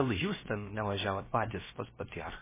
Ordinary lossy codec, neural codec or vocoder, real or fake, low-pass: MP3, 16 kbps; codec, 16 kHz, about 1 kbps, DyCAST, with the encoder's durations; fake; 3.6 kHz